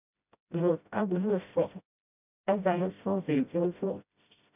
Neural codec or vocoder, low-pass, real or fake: codec, 16 kHz, 0.5 kbps, FreqCodec, smaller model; 3.6 kHz; fake